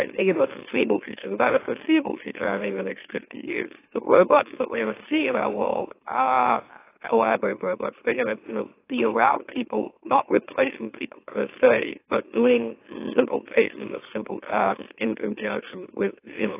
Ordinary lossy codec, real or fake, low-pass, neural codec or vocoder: AAC, 24 kbps; fake; 3.6 kHz; autoencoder, 44.1 kHz, a latent of 192 numbers a frame, MeloTTS